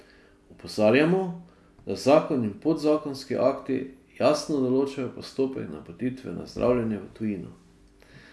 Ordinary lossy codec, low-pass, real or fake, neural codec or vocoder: none; none; real; none